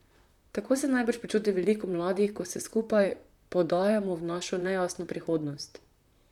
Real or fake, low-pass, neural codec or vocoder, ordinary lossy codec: fake; 19.8 kHz; vocoder, 44.1 kHz, 128 mel bands, Pupu-Vocoder; none